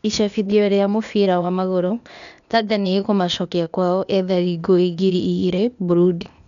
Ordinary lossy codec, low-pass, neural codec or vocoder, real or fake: none; 7.2 kHz; codec, 16 kHz, 0.8 kbps, ZipCodec; fake